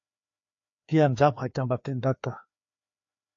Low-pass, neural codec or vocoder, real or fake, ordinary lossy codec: 7.2 kHz; codec, 16 kHz, 2 kbps, FreqCodec, larger model; fake; AAC, 48 kbps